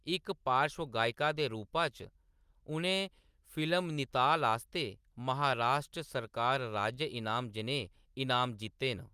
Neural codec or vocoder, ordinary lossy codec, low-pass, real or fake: none; Opus, 32 kbps; 14.4 kHz; real